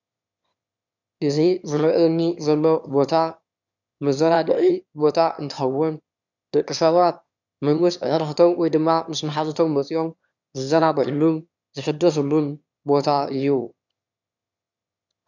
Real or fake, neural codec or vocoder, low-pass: fake; autoencoder, 22.05 kHz, a latent of 192 numbers a frame, VITS, trained on one speaker; 7.2 kHz